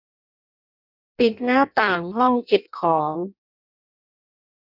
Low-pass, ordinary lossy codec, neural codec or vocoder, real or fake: 5.4 kHz; none; codec, 16 kHz in and 24 kHz out, 0.6 kbps, FireRedTTS-2 codec; fake